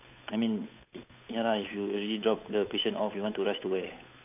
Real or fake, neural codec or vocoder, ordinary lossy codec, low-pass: fake; codec, 16 kHz, 8 kbps, FunCodec, trained on Chinese and English, 25 frames a second; none; 3.6 kHz